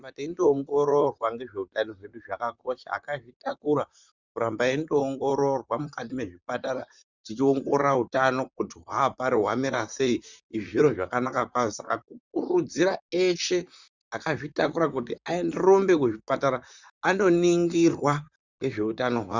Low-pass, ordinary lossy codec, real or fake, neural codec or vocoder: 7.2 kHz; Opus, 64 kbps; fake; vocoder, 44.1 kHz, 128 mel bands, Pupu-Vocoder